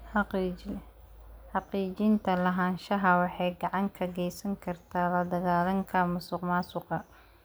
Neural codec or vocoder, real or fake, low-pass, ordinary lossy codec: none; real; none; none